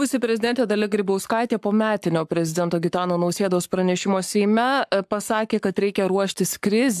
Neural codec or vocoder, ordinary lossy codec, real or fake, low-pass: codec, 44.1 kHz, 7.8 kbps, Pupu-Codec; AAC, 96 kbps; fake; 14.4 kHz